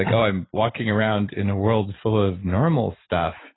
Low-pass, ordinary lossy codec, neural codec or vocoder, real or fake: 7.2 kHz; AAC, 16 kbps; none; real